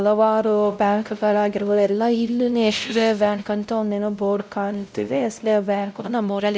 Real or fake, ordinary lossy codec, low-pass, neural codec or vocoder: fake; none; none; codec, 16 kHz, 0.5 kbps, X-Codec, WavLM features, trained on Multilingual LibriSpeech